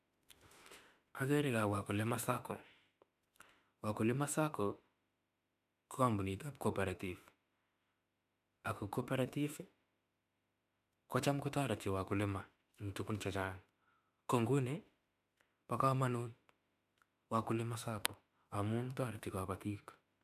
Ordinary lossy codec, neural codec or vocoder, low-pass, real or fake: none; autoencoder, 48 kHz, 32 numbers a frame, DAC-VAE, trained on Japanese speech; 14.4 kHz; fake